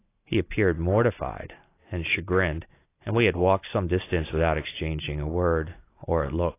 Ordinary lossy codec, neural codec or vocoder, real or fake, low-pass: AAC, 24 kbps; none; real; 3.6 kHz